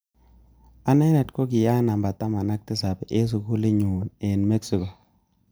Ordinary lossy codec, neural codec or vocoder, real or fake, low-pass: none; none; real; none